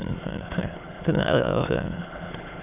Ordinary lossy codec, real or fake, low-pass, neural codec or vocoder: none; fake; 3.6 kHz; autoencoder, 22.05 kHz, a latent of 192 numbers a frame, VITS, trained on many speakers